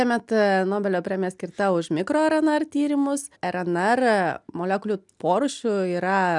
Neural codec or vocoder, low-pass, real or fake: none; 10.8 kHz; real